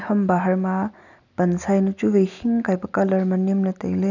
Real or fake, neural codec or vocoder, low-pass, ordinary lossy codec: real; none; 7.2 kHz; none